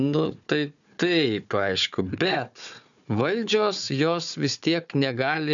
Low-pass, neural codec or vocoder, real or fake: 7.2 kHz; codec, 16 kHz, 4 kbps, FunCodec, trained on Chinese and English, 50 frames a second; fake